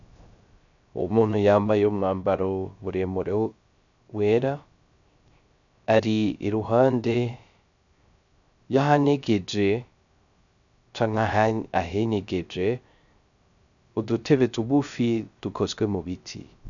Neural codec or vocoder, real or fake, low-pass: codec, 16 kHz, 0.3 kbps, FocalCodec; fake; 7.2 kHz